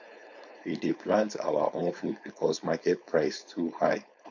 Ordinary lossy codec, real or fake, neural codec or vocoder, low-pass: none; fake; codec, 16 kHz, 4.8 kbps, FACodec; 7.2 kHz